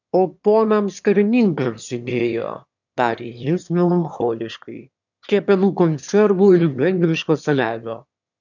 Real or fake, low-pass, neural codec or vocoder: fake; 7.2 kHz; autoencoder, 22.05 kHz, a latent of 192 numbers a frame, VITS, trained on one speaker